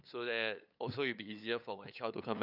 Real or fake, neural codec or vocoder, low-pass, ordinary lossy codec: fake; codec, 16 kHz, 8 kbps, FunCodec, trained on LibriTTS, 25 frames a second; 5.4 kHz; none